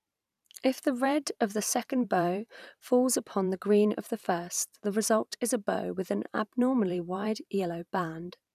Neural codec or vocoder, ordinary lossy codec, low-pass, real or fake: vocoder, 44.1 kHz, 128 mel bands every 512 samples, BigVGAN v2; none; 14.4 kHz; fake